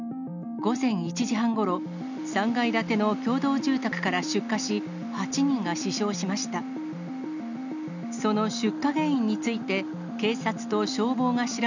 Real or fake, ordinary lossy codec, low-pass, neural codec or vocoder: real; none; 7.2 kHz; none